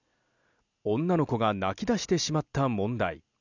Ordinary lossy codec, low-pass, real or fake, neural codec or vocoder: none; 7.2 kHz; real; none